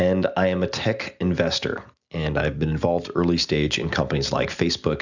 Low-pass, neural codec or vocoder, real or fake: 7.2 kHz; none; real